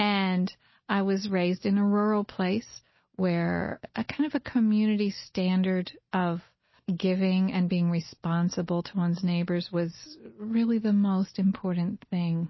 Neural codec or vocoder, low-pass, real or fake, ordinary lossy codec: none; 7.2 kHz; real; MP3, 24 kbps